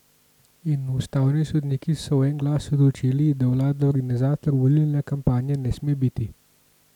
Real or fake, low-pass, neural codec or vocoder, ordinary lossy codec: real; 19.8 kHz; none; none